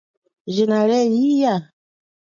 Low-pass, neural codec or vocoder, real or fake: 7.2 kHz; none; real